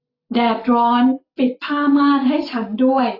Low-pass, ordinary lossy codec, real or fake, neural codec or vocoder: 5.4 kHz; AAC, 24 kbps; real; none